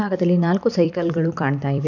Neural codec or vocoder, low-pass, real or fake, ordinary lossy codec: vocoder, 44.1 kHz, 128 mel bands every 256 samples, BigVGAN v2; 7.2 kHz; fake; none